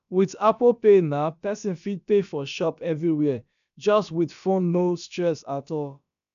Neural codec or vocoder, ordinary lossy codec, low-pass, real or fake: codec, 16 kHz, about 1 kbps, DyCAST, with the encoder's durations; none; 7.2 kHz; fake